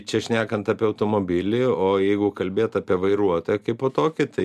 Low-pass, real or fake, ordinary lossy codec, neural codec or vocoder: 14.4 kHz; real; AAC, 96 kbps; none